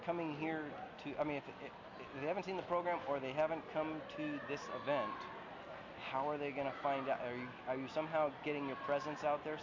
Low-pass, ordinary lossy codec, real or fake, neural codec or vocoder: 7.2 kHz; MP3, 48 kbps; real; none